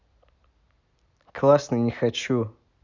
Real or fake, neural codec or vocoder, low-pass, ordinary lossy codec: fake; vocoder, 44.1 kHz, 128 mel bands every 256 samples, BigVGAN v2; 7.2 kHz; none